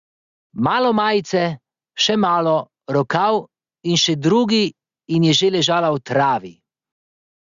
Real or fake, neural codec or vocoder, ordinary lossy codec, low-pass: real; none; Opus, 64 kbps; 7.2 kHz